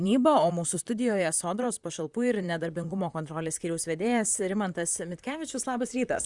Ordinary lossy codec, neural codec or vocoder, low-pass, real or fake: Opus, 64 kbps; vocoder, 44.1 kHz, 128 mel bands, Pupu-Vocoder; 10.8 kHz; fake